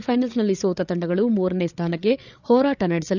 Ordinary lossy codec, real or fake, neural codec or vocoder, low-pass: none; fake; codec, 16 kHz, 8 kbps, FreqCodec, larger model; 7.2 kHz